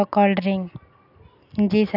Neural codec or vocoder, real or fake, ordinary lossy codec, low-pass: none; real; none; 5.4 kHz